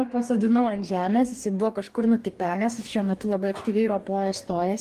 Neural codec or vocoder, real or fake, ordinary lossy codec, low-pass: codec, 44.1 kHz, 2.6 kbps, DAC; fake; Opus, 24 kbps; 14.4 kHz